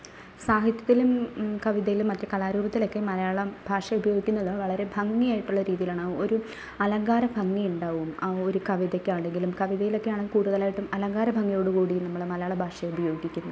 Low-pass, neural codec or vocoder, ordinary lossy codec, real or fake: none; none; none; real